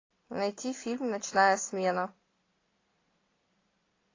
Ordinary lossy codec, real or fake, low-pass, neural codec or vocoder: AAC, 32 kbps; real; 7.2 kHz; none